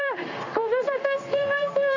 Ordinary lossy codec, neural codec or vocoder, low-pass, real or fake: none; codec, 16 kHz in and 24 kHz out, 1 kbps, XY-Tokenizer; 7.2 kHz; fake